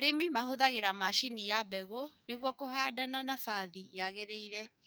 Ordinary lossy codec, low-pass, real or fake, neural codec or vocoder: none; none; fake; codec, 44.1 kHz, 2.6 kbps, SNAC